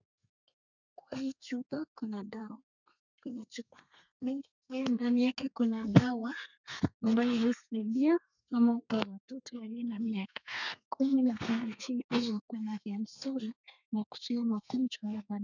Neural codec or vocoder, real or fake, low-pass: codec, 32 kHz, 1.9 kbps, SNAC; fake; 7.2 kHz